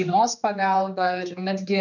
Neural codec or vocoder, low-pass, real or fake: codec, 16 kHz, 2 kbps, X-Codec, HuBERT features, trained on general audio; 7.2 kHz; fake